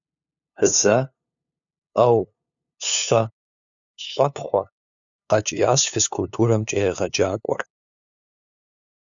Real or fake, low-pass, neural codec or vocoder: fake; 7.2 kHz; codec, 16 kHz, 2 kbps, FunCodec, trained on LibriTTS, 25 frames a second